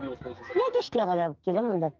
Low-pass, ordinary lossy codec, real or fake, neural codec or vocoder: 7.2 kHz; Opus, 32 kbps; fake; codec, 44.1 kHz, 2.6 kbps, SNAC